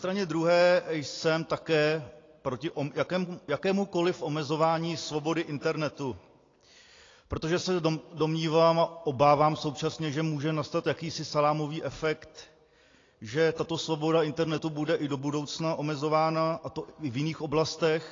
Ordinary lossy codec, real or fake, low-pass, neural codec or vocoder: AAC, 32 kbps; real; 7.2 kHz; none